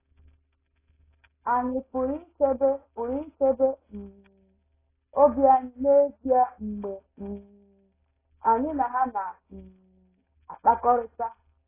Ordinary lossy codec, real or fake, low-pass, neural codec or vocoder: AAC, 16 kbps; real; 3.6 kHz; none